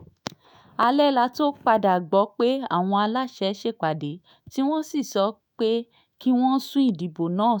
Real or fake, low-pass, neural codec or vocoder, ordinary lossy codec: fake; none; autoencoder, 48 kHz, 128 numbers a frame, DAC-VAE, trained on Japanese speech; none